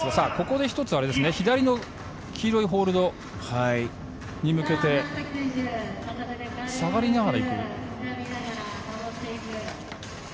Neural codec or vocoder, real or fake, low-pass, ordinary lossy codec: none; real; none; none